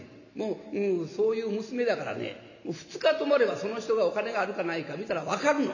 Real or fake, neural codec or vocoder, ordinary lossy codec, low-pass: real; none; none; 7.2 kHz